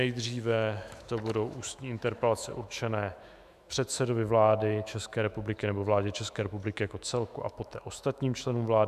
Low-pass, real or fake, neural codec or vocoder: 14.4 kHz; fake; autoencoder, 48 kHz, 128 numbers a frame, DAC-VAE, trained on Japanese speech